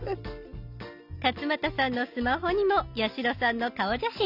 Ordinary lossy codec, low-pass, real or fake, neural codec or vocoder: none; 5.4 kHz; real; none